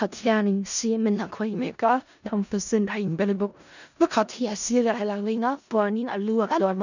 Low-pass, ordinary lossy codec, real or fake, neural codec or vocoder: 7.2 kHz; none; fake; codec, 16 kHz in and 24 kHz out, 0.4 kbps, LongCat-Audio-Codec, four codebook decoder